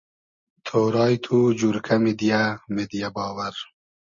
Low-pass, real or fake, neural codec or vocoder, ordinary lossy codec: 7.2 kHz; real; none; MP3, 32 kbps